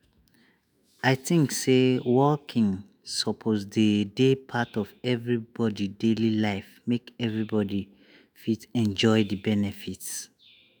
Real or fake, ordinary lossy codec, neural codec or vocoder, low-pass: fake; none; autoencoder, 48 kHz, 128 numbers a frame, DAC-VAE, trained on Japanese speech; none